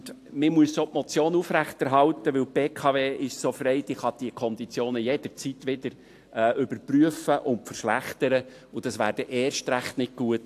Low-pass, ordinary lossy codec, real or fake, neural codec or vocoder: 14.4 kHz; AAC, 64 kbps; real; none